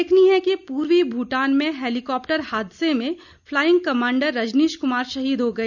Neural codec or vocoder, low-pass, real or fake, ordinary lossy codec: none; 7.2 kHz; real; none